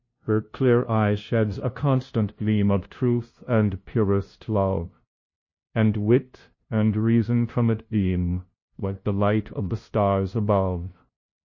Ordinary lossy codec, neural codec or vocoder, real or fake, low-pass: MP3, 32 kbps; codec, 16 kHz, 0.5 kbps, FunCodec, trained on LibriTTS, 25 frames a second; fake; 7.2 kHz